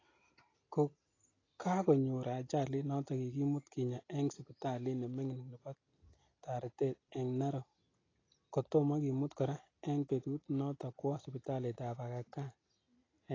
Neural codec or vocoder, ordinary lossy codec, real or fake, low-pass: none; AAC, 32 kbps; real; 7.2 kHz